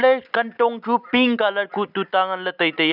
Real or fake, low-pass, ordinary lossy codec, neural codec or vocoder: real; 5.4 kHz; none; none